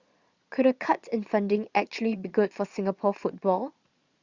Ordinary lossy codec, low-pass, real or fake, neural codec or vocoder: Opus, 64 kbps; 7.2 kHz; fake; vocoder, 22.05 kHz, 80 mel bands, Vocos